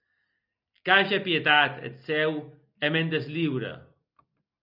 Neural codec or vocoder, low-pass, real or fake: none; 5.4 kHz; real